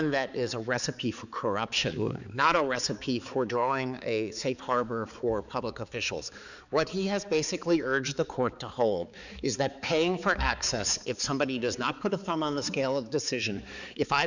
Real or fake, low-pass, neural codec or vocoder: fake; 7.2 kHz; codec, 16 kHz, 4 kbps, X-Codec, HuBERT features, trained on balanced general audio